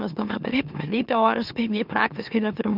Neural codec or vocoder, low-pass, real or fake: autoencoder, 44.1 kHz, a latent of 192 numbers a frame, MeloTTS; 5.4 kHz; fake